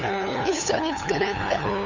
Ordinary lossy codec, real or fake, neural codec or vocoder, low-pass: none; fake; codec, 16 kHz, 8 kbps, FunCodec, trained on LibriTTS, 25 frames a second; 7.2 kHz